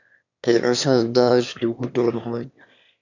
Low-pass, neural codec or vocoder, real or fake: 7.2 kHz; autoencoder, 22.05 kHz, a latent of 192 numbers a frame, VITS, trained on one speaker; fake